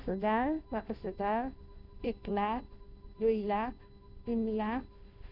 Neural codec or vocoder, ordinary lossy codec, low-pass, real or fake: codec, 16 kHz, 0.5 kbps, FunCodec, trained on Chinese and English, 25 frames a second; none; 5.4 kHz; fake